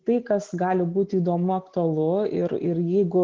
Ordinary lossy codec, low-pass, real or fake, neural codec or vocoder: Opus, 16 kbps; 7.2 kHz; real; none